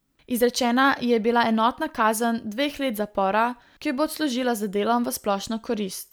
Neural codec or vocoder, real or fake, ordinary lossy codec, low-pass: none; real; none; none